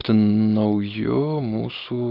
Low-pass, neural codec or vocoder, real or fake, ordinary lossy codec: 5.4 kHz; none; real; Opus, 32 kbps